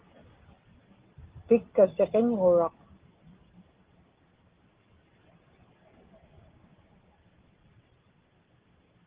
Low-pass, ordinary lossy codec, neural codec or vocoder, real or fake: 3.6 kHz; Opus, 16 kbps; none; real